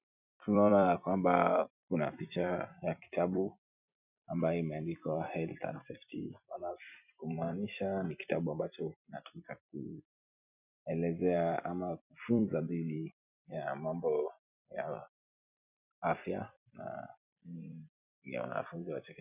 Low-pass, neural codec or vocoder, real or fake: 3.6 kHz; vocoder, 44.1 kHz, 128 mel bands every 256 samples, BigVGAN v2; fake